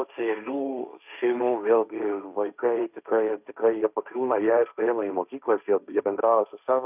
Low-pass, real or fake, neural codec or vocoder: 3.6 kHz; fake; codec, 16 kHz, 1.1 kbps, Voila-Tokenizer